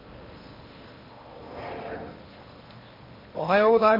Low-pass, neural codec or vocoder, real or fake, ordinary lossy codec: 5.4 kHz; codec, 16 kHz in and 24 kHz out, 0.8 kbps, FocalCodec, streaming, 65536 codes; fake; MP3, 24 kbps